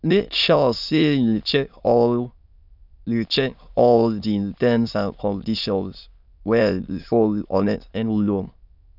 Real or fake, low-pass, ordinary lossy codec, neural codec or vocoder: fake; 5.4 kHz; none; autoencoder, 22.05 kHz, a latent of 192 numbers a frame, VITS, trained on many speakers